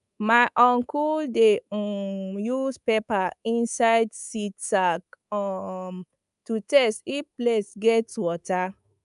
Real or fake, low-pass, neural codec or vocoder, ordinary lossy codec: fake; 10.8 kHz; codec, 24 kHz, 3.1 kbps, DualCodec; none